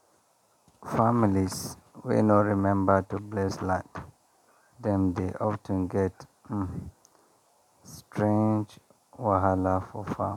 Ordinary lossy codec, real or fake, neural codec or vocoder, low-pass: none; real; none; 19.8 kHz